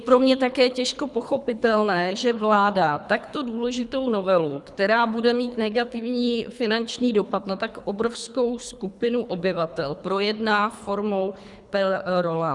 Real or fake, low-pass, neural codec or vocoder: fake; 10.8 kHz; codec, 24 kHz, 3 kbps, HILCodec